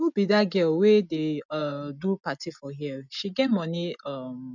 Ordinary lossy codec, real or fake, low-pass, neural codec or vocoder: none; real; 7.2 kHz; none